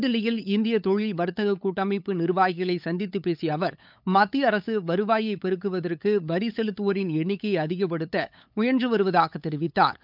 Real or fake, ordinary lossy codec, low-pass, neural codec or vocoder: fake; none; 5.4 kHz; codec, 16 kHz, 8 kbps, FunCodec, trained on LibriTTS, 25 frames a second